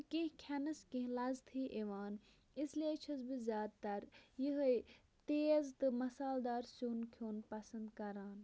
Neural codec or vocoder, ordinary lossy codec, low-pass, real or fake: none; none; none; real